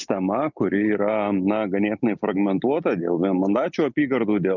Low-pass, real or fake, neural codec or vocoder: 7.2 kHz; real; none